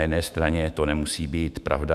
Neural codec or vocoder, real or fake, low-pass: autoencoder, 48 kHz, 128 numbers a frame, DAC-VAE, trained on Japanese speech; fake; 14.4 kHz